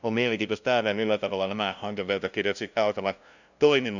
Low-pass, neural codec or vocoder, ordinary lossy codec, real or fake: 7.2 kHz; codec, 16 kHz, 0.5 kbps, FunCodec, trained on LibriTTS, 25 frames a second; none; fake